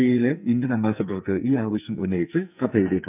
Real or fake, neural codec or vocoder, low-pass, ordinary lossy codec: fake; codec, 44.1 kHz, 2.6 kbps, SNAC; 3.6 kHz; none